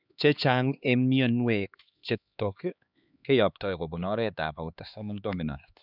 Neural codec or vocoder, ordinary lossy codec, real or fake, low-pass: codec, 16 kHz, 2 kbps, X-Codec, HuBERT features, trained on LibriSpeech; none; fake; 5.4 kHz